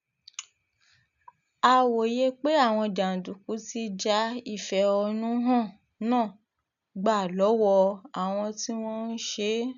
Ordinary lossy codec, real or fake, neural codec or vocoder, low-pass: none; real; none; 7.2 kHz